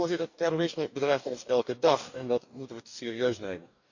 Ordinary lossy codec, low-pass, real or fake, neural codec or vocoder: none; 7.2 kHz; fake; codec, 44.1 kHz, 2.6 kbps, DAC